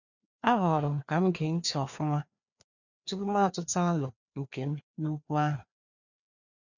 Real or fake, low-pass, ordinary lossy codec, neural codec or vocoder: fake; 7.2 kHz; none; codec, 16 kHz, 2 kbps, FreqCodec, larger model